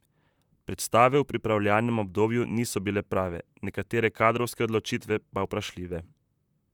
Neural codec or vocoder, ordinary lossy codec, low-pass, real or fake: vocoder, 44.1 kHz, 128 mel bands, Pupu-Vocoder; none; 19.8 kHz; fake